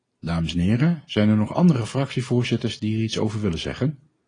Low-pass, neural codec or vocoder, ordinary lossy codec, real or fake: 9.9 kHz; none; AAC, 32 kbps; real